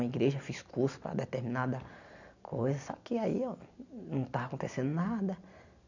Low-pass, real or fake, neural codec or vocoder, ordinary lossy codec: 7.2 kHz; real; none; none